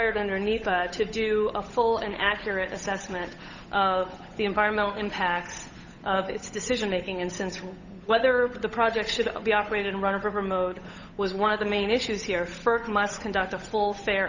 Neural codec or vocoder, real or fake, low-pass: codec, 16 kHz, 8 kbps, FunCodec, trained on Chinese and English, 25 frames a second; fake; 7.2 kHz